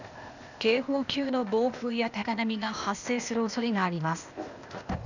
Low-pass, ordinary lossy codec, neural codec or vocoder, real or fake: 7.2 kHz; none; codec, 16 kHz, 0.8 kbps, ZipCodec; fake